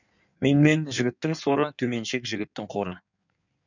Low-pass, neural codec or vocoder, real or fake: 7.2 kHz; codec, 16 kHz in and 24 kHz out, 1.1 kbps, FireRedTTS-2 codec; fake